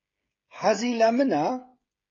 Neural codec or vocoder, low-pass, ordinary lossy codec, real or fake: codec, 16 kHz, 16 kbps, FreqCodec, smaller model; 7.2 kHz; AAC, 32 kbps; fake